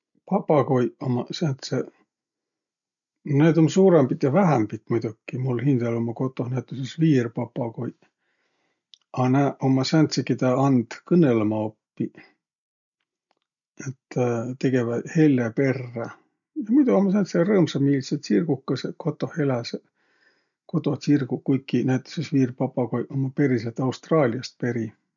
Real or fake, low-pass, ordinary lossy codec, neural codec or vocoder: real; 7.2 kHz; none; none